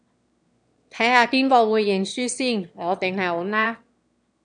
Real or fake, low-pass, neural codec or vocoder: fake; 9.9 kHz; autoencoder, 22.05 kHz, a latent of 192 numbers a frame, VITS, trained on one speaker